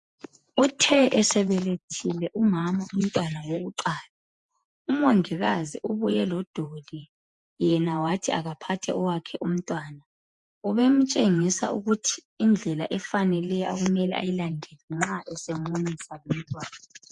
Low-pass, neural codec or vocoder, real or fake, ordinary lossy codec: 10.8 kHz; vocoder, 48 kHz, 128 mel bands, Vocos; fake; MP3, 64 kbps